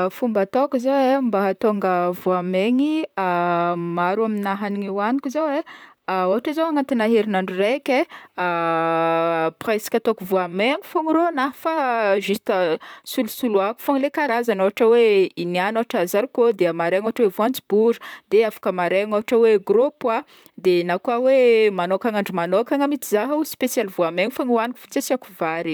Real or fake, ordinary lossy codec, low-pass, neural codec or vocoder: fake; none; none; vocoder, 44.1 kHz, 128 mel bands, Pupu-Vocoder